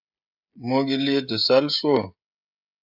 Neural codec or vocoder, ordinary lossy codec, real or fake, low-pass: codec, 16 kHz, 16 kbps, FreqCodec, smaller model; AAC, 48 kbps; fake; 5.4 kHz